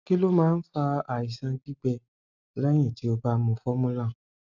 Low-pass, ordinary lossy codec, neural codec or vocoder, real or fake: 7.2 kHz; none; none; real